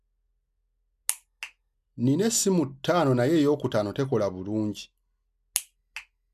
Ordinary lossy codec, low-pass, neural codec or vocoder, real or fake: none; 14.4 kHz; none; real